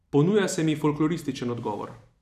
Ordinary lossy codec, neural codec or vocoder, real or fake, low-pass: none; vocoder, 44.1 kHz, 128 mel bands every 256 samples, BigVGAN v2; fake; 14.4 kHz